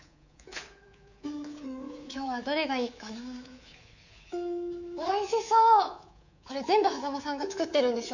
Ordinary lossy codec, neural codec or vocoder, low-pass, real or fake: none; codec, 24 kHz, 3.1 kbps, DualCodec; 7.2 kHz; fake